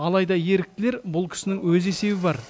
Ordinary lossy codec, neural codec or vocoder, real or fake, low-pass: none; none; real; none